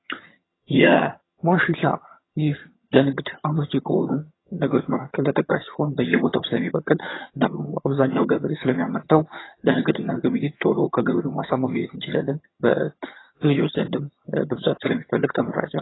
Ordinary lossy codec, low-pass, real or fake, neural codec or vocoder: AAC, 16 kbps; 7.2 kHz; fake; vocoder, 22.05 kHz, 80 mel bands, HiFi-GAN